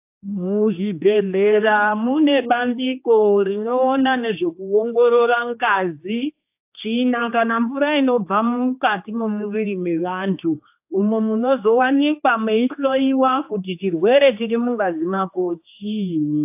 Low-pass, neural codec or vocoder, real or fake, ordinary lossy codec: 3.6 kHz; codec, 16 kHz, 2 kbps, X-Codec, HuBERT features, trained on general audio; fake; AAC, 32 kbps